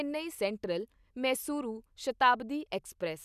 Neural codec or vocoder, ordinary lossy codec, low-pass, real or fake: none; none; 14.4 kHz; real